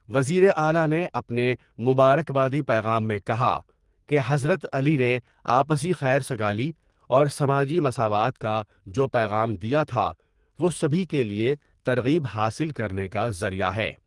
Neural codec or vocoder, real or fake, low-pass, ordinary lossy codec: codec, 44.1 kHz, 2.6 kbps, SNAC; fake; 10.8 kHz; Opus, 24 kbps